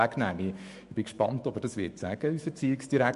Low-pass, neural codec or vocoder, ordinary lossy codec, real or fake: 14.4 kHz; autoencoder, 48 kHz, 128 numbers a frame, DAC-VAE, trained on Japanese speech; MP3, 48 kbps; fake